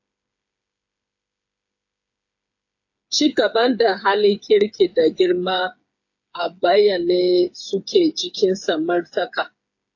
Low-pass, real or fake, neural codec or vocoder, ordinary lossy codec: 7.2 kHz; fake; codec, 16 kHz, 8 kbps, FreqCodec, smaller model; AAC, 48 kbps